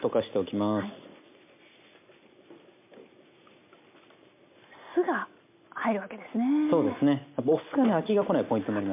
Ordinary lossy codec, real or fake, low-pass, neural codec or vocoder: MP3, 32 kbps; real; 3.6 kHz; none